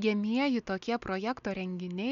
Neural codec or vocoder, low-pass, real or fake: none; 7.2 kHz; real